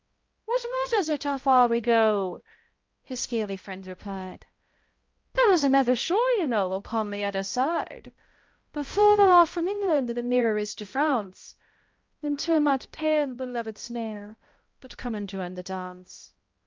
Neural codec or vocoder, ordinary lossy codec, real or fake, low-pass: codec, 16 kHz, 0.5 kbps, X-Codec, HuBERT features, trained on balanced general audio; Opus, 24 kbps; fake; 7.2 kHz